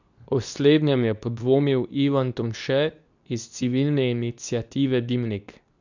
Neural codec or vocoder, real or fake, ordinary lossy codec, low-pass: codec, 24 kHz, 0.9 kbps, WavTokenizer, medium speech release version 2; fake; none; 7.2 kHz